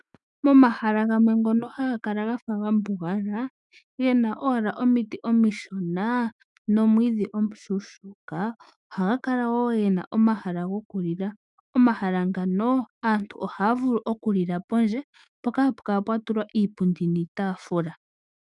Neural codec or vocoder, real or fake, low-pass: autoencoder, 48 kHz, 128 numbers a frame, DAC-VAE, trained on Japanese speech; fake; 10.8 kHz